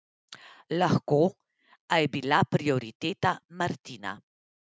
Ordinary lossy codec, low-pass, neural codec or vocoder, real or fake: none; none; none; real